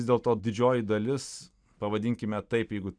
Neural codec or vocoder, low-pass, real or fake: none; 9.9 kHz; real